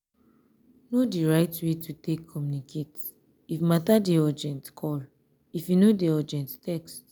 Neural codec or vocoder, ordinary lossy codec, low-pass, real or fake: none; none; none; real